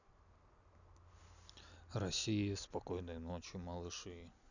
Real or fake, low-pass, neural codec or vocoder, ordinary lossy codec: real; 7.2 kHz; none; none